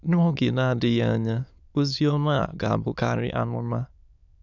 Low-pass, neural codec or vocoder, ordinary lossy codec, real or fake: 7.2 kHz; autoencoder, 22.05 kHz, a latent of 192 numbers a frame, VITS, trained on many speakers; none; fake